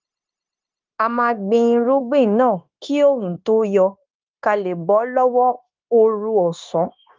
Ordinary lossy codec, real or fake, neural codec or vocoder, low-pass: Opus, 24 kbps; fake; codec, 16 kHz, 0.9 kbps, LongCat-Audio-Codec; 7.2 kHz